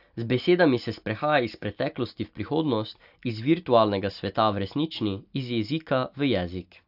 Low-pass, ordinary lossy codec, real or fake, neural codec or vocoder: 5.4 kHz; AAC, 48 kbps; real; none